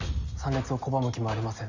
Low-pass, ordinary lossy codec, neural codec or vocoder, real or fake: 7.2 kHz; none; none; real